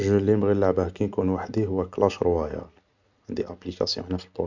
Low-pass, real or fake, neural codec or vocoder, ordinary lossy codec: 7.2 kHz; real; none; none